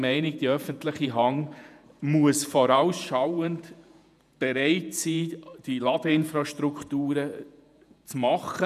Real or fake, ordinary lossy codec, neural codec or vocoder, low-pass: fake; none; vocoder, 48 kHz, 128 mel bands, Vocos; 14.4 kHz